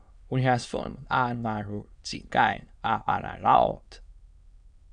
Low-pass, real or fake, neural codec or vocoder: 9.9 kHz; fake; autoencoder, 22.05 kHz, a latent of 192 numbers a frame, VITS, trained on many speakers